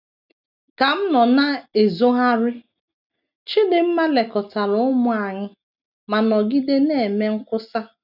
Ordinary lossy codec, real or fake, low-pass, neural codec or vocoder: none; real; 5.4 kHz; none